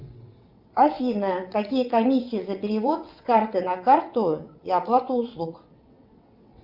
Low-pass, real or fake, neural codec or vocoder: 5.4 kHz; fake; vocoder, 22.05 kHz, 80 mel bands, WaveNeXt